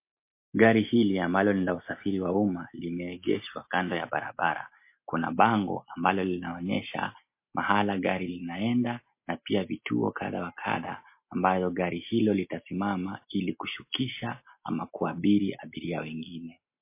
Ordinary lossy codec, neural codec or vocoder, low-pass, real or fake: MP3, 24 kbps; none; 3.6 kHz; real